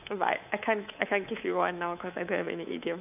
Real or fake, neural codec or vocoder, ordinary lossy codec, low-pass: fake; codec, 24 kHz, 3.1 kbps, DualCodec; none; 3.6 kHz